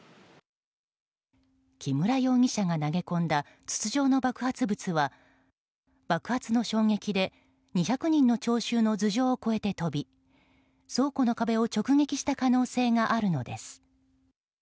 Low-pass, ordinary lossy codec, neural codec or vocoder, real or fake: none; none; none; real